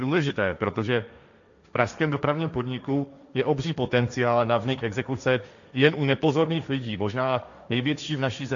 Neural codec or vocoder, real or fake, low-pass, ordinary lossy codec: codec, 16 kHz, 1.1 kbps, Voila-Tokenizer; fake; 7.2 kHz; MP3, 96 kbps